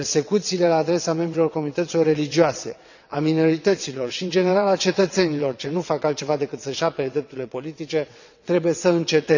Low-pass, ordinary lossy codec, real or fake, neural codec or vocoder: 7.2 kHz; none; fake; vocoder, 22.05 kHz, 80 mel bands, WaveNeXt